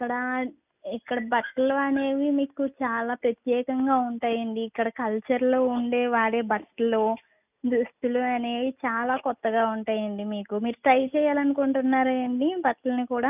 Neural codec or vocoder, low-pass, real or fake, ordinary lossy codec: none; 3.6 kHz; real; none